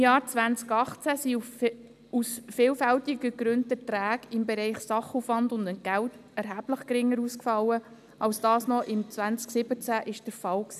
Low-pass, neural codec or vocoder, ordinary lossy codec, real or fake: 14.4 kHz; none; none; real